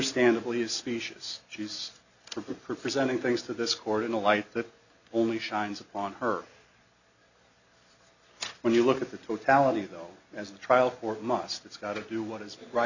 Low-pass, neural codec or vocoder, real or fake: 7.2 kHz; none; real